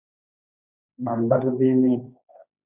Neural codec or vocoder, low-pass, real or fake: codec, 16 kHz, 1.1 kbps, Voila-Tokenizer; 3.6 kHz; fake